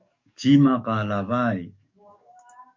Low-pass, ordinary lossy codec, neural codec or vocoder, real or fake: 7.2 kHz; MP3, 64 kbps; codec, 44.1 kHz, 7.8 kbps, DAC; fake